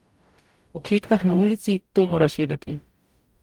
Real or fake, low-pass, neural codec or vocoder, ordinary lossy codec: fake; 19.8 kHz; codec, 44.1 kHz, 0.9 kbps, DAC; Opus, 32 kbps